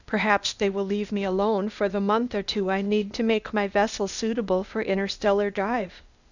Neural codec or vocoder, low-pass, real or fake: codec, 16 kHz, 0.8 kbps, ZipCodec; 7.2 kHz; fake